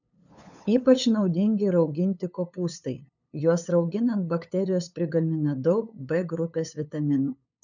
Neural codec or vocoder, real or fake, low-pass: codec, 16 kHz, 8 kbps, FunCodec, trained on LibriTTS, 25 frames a second; fake; 7.2 kHz